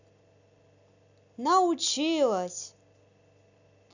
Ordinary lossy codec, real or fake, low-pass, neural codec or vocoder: none; real; 7.2 kHz; none